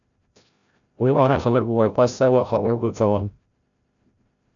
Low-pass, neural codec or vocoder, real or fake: 7.2 kHz; codec, 16 kHz, 0.5 kbps, FreqCodec, larger model; fake